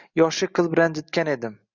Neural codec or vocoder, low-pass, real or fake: none; 7.2 kHz; real